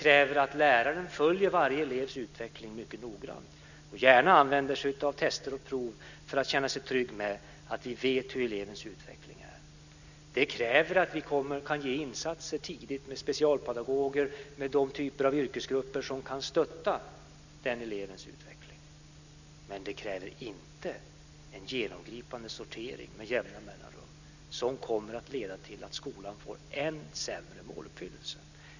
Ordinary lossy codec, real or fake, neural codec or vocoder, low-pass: none; real; none; 7.2 kHz